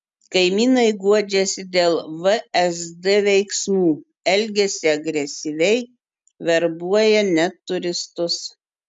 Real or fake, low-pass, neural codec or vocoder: real; 10.8 kHz; none